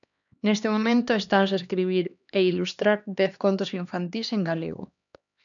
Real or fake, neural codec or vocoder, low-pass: fake; codec, 16 kHz, 2 kbps, X-Codec, HuBERT features, trained on LibriSpeech; 7.2 kHz